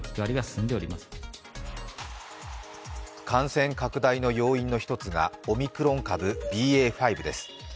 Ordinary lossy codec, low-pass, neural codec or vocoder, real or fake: none; none; none; real